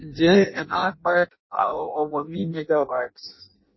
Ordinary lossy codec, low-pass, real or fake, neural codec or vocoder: MP3, 24 kbps; 7.2 kHz; fake; codec, 16 kHz in and 24 kHz out, 0.6 kbps, FireRedTTS-2 codec